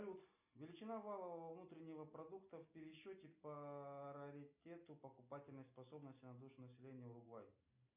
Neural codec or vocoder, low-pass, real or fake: none; 3.6 kHz; real